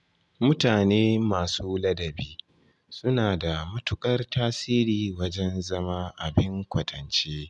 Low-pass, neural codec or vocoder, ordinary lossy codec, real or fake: 10.8 kHz; none; none; real